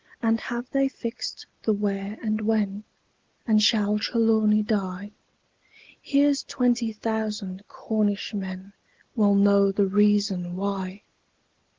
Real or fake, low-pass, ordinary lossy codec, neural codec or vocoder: real; 7.2 kHz; Opus, 16 kbps; none